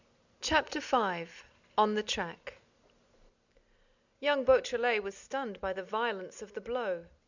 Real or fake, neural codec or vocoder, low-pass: real; none; 7.2 kHz